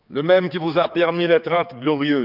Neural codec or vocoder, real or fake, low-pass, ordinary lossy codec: codec, 16 kHz, 4 kbps, X-Codec, HuBERT features, trained on general audio; fake; 5.4 kHz; none